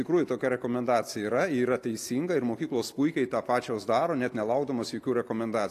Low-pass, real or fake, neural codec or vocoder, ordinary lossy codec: 14.4 kHz; real; none; AAC, 64 kbps